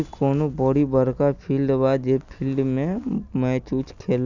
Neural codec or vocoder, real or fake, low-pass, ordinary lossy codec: none; real; 7.2 kHz; none